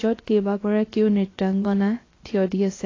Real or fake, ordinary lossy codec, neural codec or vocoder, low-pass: fake; AAC, 32 kbps; codec, 16 kHz, 0.7 kbps, FocalCodec; 7.2 kHz